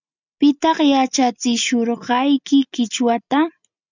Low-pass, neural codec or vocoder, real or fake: 7.2 kHz; none; real